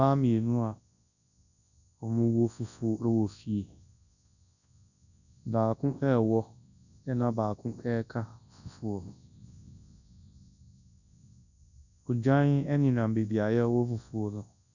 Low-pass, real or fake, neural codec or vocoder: 7.2 kHz; fake; codec, 24 kHz, 0.9 kbps, WavTokenizer, large speech release